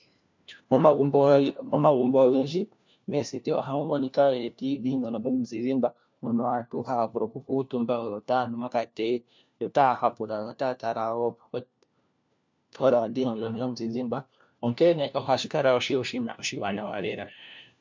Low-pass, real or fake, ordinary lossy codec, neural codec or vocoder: 7.2 kHz; fake; MP3, 64 kbps; codec, 16 kHz, 1 kbps, FunCodec, trained on LibriTTS, 50 frames a second